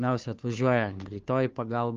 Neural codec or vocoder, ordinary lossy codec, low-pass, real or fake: codec, 16 kHz, 2 kbps, FunCodec, trained on Chinese and English, 25 frames a second; Opus, 24 kbps; 7.2 kHz; fake